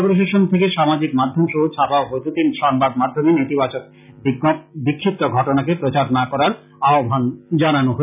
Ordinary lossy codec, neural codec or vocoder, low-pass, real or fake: none; none; 3.6 kHz; real